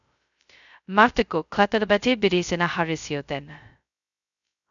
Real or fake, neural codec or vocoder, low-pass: fake; codec, 16 kHz, 0.2 kbps, FocalCodec; 7.2 kHz